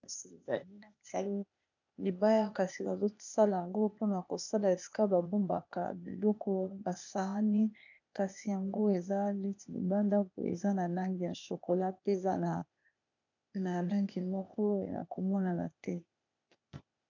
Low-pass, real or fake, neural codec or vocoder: 7.2 kHz; fake; codec, 16 kHz, 0.8 kbps, ZipCodec